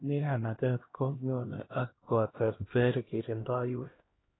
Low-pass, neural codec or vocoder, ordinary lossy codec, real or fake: 7.2 kHz; codec, 16 kHz, 1 kbps, X-Codec, HuBERT features, trained on LibriSpeech; AAC, 16 kbps; fake